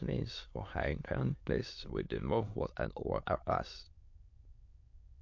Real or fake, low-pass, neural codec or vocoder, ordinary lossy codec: fake; 7.2 kHz; autoencoder, 22.05 kHz, a latent of 192 numbers a frame, VITS, trained on many speakers; MP3, 48 kbps